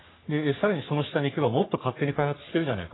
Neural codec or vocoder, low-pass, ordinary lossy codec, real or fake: codec, 44.1 kHz, 3.4 kbps, Pupu-Codec; 7.2 kHz; AAC, 16 kbps; fake